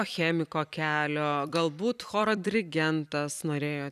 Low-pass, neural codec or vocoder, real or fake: 14.4 kHz; vocoder, 44.1 kHz, 128 mel bands every 256 samples, BigVGAN v2; fake